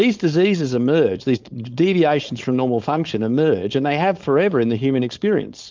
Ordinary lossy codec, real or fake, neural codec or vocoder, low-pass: Opus, 24 kbps; fake; codec, 16 kHz, 4.8 kbps, FACodec; 7.2 kHz